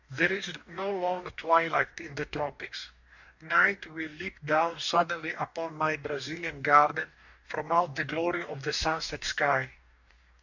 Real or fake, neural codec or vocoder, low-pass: fake; codec, 44.1 kHz, 2.6 kbps, DAC; 7.2 kHz